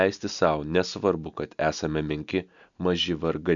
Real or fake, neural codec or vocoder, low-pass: real; none; 7.2 kHz